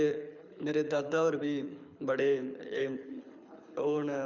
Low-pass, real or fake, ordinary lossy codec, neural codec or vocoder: 7.2 kHz; fake; none; codec, 24 kHz, 6 kbps, HILCodec